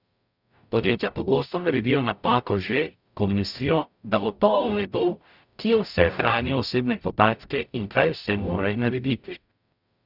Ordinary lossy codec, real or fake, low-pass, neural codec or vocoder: none; fake; 5.4 kHz; codec, 44.1 kHz, 0.9 kbps, DAC